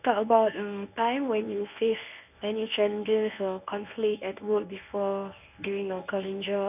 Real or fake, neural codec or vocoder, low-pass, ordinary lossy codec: fake; codec, 24 kHz, 0.9 kbps, WavTokenizer, medium speech release version 1; 3.6 kHz; none